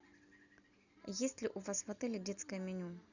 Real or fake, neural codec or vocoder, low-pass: real; none; 7.2 kHz